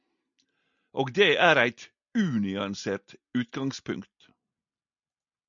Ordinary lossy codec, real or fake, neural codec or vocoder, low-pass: MP3, 96 kbps; real; none; 7.2 kHz